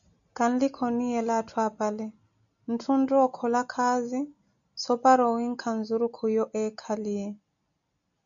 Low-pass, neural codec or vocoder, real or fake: 7.2 kHz; none; real